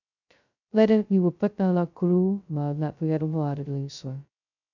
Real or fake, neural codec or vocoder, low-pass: fake; codec, 16 kHz, 0.2 kbps, FocalCodec; 7.2 kHz